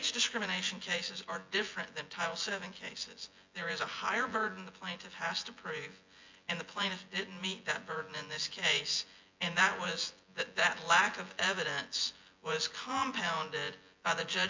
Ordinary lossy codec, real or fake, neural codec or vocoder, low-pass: MP3, 48 kbps; fake; vocoder, 24 kHz, 100 mel bands, Vocos; 7.2 kHz